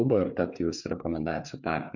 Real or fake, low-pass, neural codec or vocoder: fake; 7.2 kHz; codec, 16 kHz, 4 kbps, FreqCodec, larger model